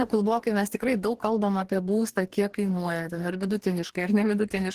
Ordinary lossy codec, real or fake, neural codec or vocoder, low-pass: Opus, 16 kbps; fake; codec, 44.1 kHz, 2.6 kbps, DAC; 14.4 kHz